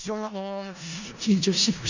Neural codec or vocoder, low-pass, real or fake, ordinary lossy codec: codec, 16 kHz in and 24 kHz out, 0.4 kbps, LongCat-Audio-Codec, four codebook decoder; 7.2 kHz; fake; none